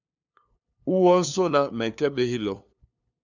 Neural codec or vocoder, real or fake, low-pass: codec, 16 kHz, 2 kbps, FunCodec, trained on LibriTTS, 25 frames a second; fake; 7.2 kHz